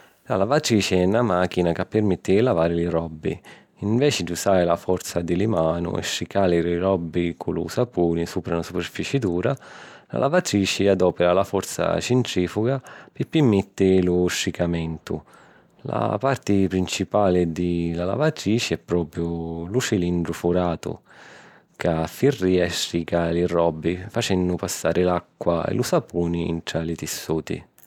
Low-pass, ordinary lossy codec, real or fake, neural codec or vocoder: 19.8 kHz; none; real; none